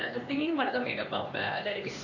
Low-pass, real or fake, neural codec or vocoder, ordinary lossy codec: 7.2 kHz; fake; codec, 16 kHz, 2 kbps, X-Codec, HuBERT features, trained on LibriSpeech; none